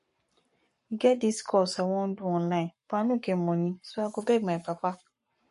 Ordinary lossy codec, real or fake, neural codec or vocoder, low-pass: MP3, 48 kbps; fake; codec, 44.1 kHz, 7.8 kbps, Pupu-Codec; 14.4 kHz